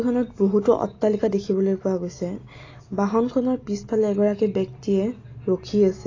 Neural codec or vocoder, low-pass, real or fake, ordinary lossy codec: none; 7.2 kHz; real; AAC, 32 kbps